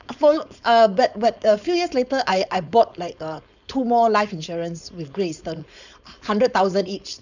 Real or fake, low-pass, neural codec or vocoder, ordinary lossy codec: fake; 7.2 kHz; codec, 16 kHz, 4.8 kbps, FACodec; none